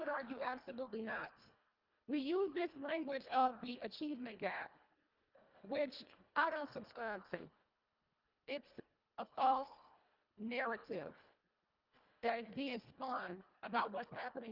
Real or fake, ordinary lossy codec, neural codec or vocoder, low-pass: fake; Opus, 32 kbps; codec, 24 kHz, 1.5 kbps, HILCodec; 5.4 kHz